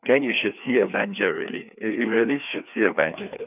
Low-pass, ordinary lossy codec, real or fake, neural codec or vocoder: 3.6 kHz; none; fake; codec, 16 kHz, 2 kbps, FreqCodec, larger model